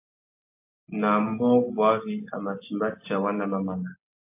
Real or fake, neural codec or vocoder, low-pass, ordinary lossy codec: real; none; 3.6 kHz; MP3, 24 kbps